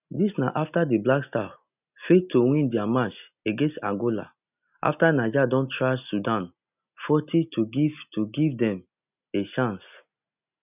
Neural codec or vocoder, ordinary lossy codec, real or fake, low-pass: none; none; real; 3.6 kHz